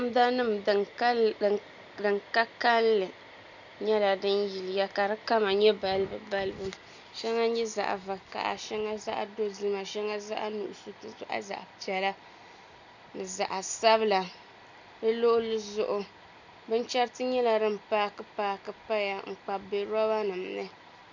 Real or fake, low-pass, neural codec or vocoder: real; 7.2 kHz; none